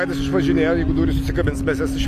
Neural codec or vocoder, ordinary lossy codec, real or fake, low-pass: none; AAC, 64 kbps; real; 14.4 kHz